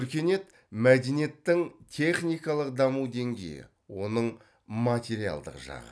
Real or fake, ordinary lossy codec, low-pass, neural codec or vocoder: real; none; none; none